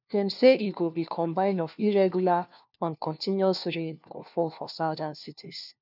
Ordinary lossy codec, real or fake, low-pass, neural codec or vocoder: none; fake; 5.4 kHz; codec, 16 kHz, 1 kbps, FunCodec, trained on LibriTTS, 50 frames a second